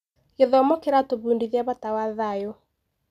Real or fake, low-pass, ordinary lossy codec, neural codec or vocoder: real; 14.4 kHz; none; none